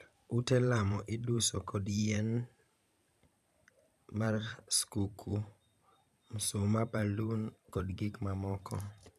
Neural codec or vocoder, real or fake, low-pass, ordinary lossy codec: vocoder, 44.1 kHz, 128 mel bands every 512 samples, BigVGAN v2; fake; 14.4 kHz; none